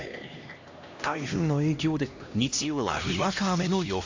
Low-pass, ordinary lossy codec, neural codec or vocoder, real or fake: 7.2 kHz; AAC, 48 kbps; codec, 16 kHz, 1 kbps, X-Codec, HuBERT features, trained on LibriSpeech; fake